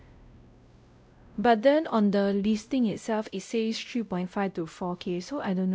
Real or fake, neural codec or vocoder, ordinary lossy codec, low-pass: fake; codec, 16 kHz, 0.5 kbps, X-Codec, WavLM features, trained on Multilingual LibriSpeech; none; none